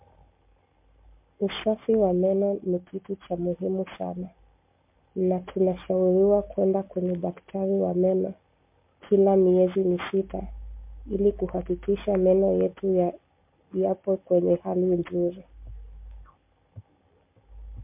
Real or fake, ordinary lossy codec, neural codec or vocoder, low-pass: real; MP3, 32 kbps; none; 3.6 kHz